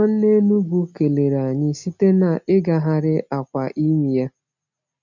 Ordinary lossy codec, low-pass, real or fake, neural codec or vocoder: none; 7.2 kHz; real; none